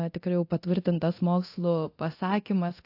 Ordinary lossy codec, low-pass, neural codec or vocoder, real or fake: AAC, 32 kbps; 5.4 kHz; codec, 24 kHz, 0.9 kbps, DualCodec; fake